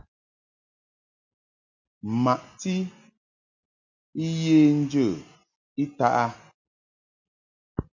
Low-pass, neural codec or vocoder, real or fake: 7.2 kHz; none; real